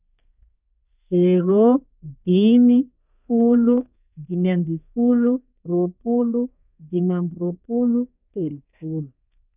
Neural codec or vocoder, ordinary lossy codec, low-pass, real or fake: codec, 32 kHz, 1.9 kbps, SNAC; none; 3.6 kHz; fake